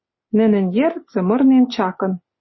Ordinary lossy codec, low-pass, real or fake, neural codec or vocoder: MP3, 24 kbps; 7.2 kHz; real; none